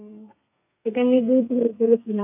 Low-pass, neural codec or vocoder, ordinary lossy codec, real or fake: 3.6 kHz; codec, 32 kHz, 1.9 kbps, SNAC; none; fake